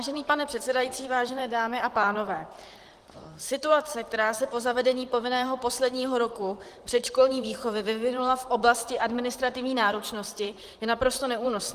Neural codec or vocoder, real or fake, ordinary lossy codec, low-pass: vocoder, 44.1 kHz, 128 mel bands, Pupu-Vocoder; fake; Opus, 24 kbps; 14.4 kHz